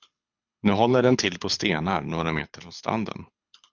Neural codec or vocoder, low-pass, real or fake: codec, 24 kHz, 6 kbps, HILCodec; 7.2 kHz; fake